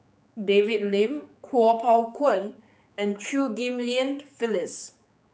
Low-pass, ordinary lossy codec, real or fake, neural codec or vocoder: none; none; fake; codec, 16 kHz, 4 kbps, X-Codec, HuBERT features, trained on general audio